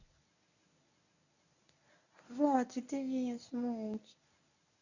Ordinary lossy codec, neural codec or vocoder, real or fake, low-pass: none; codec, 24 kHz, 0.9 kbps, WavTokenizer, medium speech release version 1; fake; 7.2 kHz